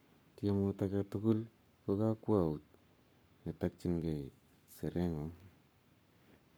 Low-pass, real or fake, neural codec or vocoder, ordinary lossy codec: none; fake; codec, 44.1 kHz, 7.8 kbps, Pupu-Codec; none